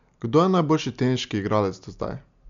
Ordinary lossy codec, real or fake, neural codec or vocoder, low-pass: MP3, 64 kbps; real; none; 7.2 kHz